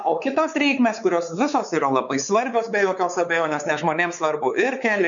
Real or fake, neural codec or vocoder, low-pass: fake; codec, 16 kHz, 4 kbps, X-Codec, WavLM features, trained on Multilingual LibriSpeech; 7.2 kHz